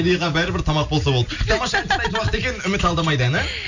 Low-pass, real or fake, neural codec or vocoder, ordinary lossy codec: 7.2 kHz; real; none; none